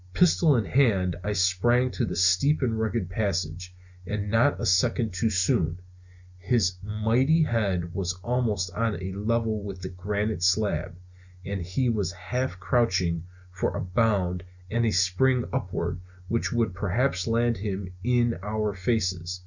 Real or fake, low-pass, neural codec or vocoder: real; 7.2 kHz; none